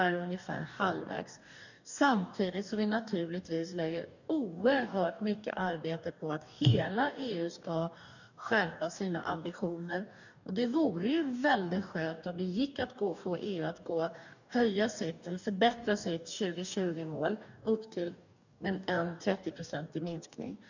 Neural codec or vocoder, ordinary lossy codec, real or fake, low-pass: codec, 44.1 kHz, 2.6 kbps, DAC; none; fake; 7.2 kHz